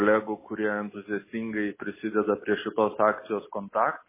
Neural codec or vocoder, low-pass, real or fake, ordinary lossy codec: none; 3.6 kHz; real; MP3, 16 kbps